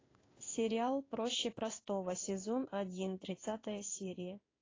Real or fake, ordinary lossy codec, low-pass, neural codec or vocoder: fake; AAC, 32 kbps; 7.2 kHz; codec, 16 kHz in and 24 kHz out, 1 kbps, XY-Tokenizer